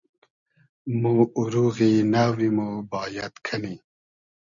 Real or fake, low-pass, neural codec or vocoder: real; 7.2 kHz; none